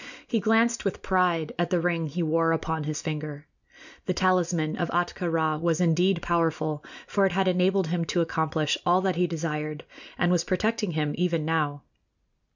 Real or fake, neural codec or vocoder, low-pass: real; none; 7.2 kHz